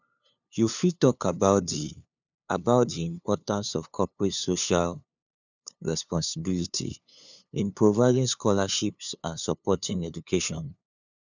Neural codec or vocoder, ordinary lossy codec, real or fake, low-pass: codec, 16 kHz, 2 kbps, FunCodec, trained on LibriTTS, 25 frames a second; none; fake; 7.2 kHz